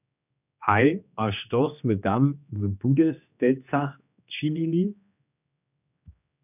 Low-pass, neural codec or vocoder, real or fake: 3.6 kHz; codec, 16 kHz, 2 kbps, X-Codec, HuBERT features, trained on general audio; fake